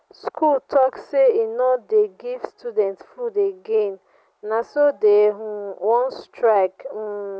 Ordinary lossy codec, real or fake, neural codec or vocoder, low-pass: none; real; none; none